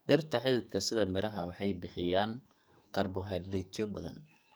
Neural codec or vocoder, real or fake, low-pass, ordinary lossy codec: codec, 44.1 kHz, 2.6 kbps, SNAC; fake; none; none